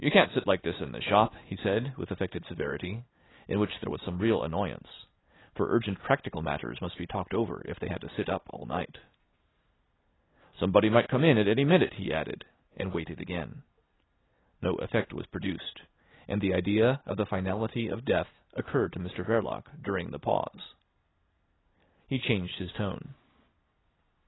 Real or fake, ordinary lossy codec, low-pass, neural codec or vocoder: real; AAC, 16 kbps; 7.2 kHz; none